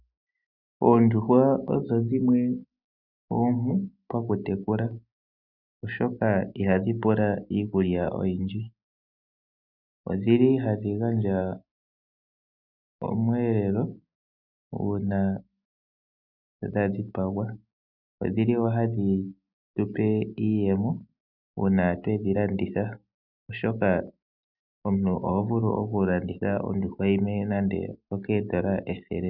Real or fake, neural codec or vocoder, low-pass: real; none; 5.4 kHz